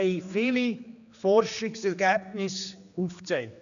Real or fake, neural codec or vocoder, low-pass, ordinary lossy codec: fake; codec, 16 kHz, 2 kbps, X-Codec, HuBERT features, trained on general audio; 7.2 kHz; none